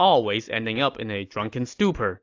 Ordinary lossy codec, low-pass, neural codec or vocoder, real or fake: AAC, 48 kbps; 7.2 kHz; none; real